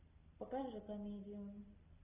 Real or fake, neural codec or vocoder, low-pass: real; none; 3.6 kHz